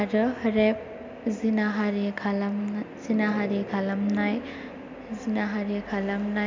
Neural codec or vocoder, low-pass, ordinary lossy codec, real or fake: none; 7.2 kHz; MP3, 64 kbps; real